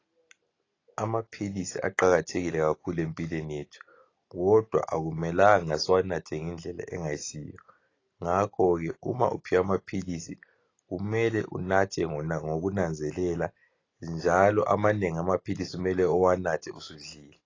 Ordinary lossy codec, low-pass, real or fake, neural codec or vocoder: AAC, 32 kbps; 7.2 kHz; real; none